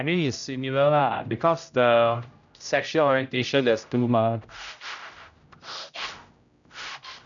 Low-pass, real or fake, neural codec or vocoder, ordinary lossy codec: 7.2 kHz; fake; codec, 16 kHz, 0.5 kbps, X-Codec, HuBERT features, trained on general audio; none